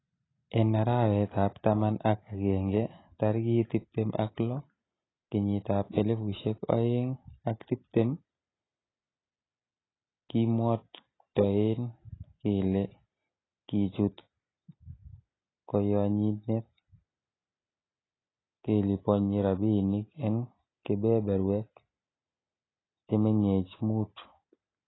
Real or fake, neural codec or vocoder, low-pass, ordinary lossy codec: real; none; 7.2 kHz; AAC, 16 kbps